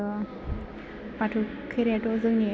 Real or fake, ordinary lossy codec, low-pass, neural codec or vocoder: real; none; none; none